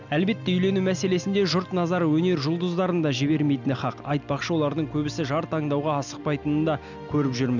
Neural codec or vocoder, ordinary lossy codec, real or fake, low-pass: none; none; real; 7.2 kHz